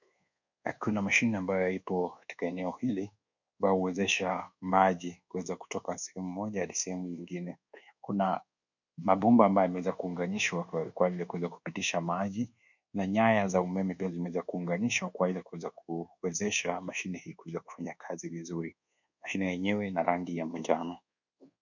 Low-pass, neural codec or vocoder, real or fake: 7.2 kHz; codec, 24 kHz, 1.2 kbps, DualCodec; fake